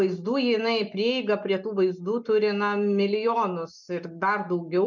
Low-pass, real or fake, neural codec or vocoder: 7.2 kHz; real; none